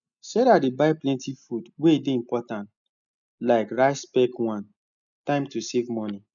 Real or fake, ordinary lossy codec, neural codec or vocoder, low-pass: real; none; none; 7.2 kHz